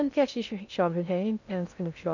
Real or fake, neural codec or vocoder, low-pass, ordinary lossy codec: fake; codec, 16 kHz in and 24 kHz out, 0.6 kbps, FocalCodec, streaming, 2048 codes; 7.2 kHz; none